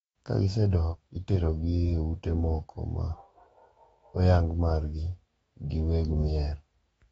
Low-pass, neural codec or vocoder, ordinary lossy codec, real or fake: 19.8 kHz; autoencoder, 48 kHz, 128 numbers a frame, DAC-VAE, trained on Japanese speech; AAC, 24 kbps; fake